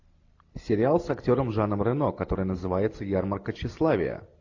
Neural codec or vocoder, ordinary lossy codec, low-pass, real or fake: none; AAC, 48 kbps; 7.2 kHz; real